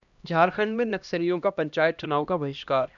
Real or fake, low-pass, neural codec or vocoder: fake; 7.2 kHz; codec, 16 kHz, 1 kbps, X-Codec, HuBERT features, trained on LibriSpeech